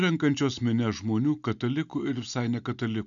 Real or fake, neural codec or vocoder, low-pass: real; none; 7.2 kHz